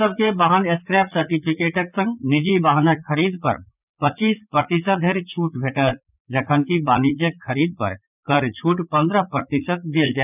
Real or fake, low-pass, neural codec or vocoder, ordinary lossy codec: fake; 3.6 kHz; vocoder, 44.1 kHz, 80 mel bands, Vocos; none